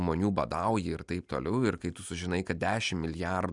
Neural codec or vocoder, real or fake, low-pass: none; real; 10.8 kHz